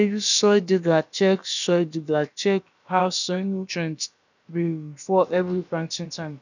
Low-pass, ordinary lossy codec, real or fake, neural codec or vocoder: 7.2 kHz; none; fake; codec, 16 kHz, about 1 kbps, DyCAST, with the encoder's durations